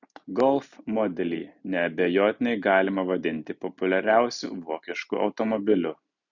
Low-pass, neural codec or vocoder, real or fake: 7.2 kHz; none; real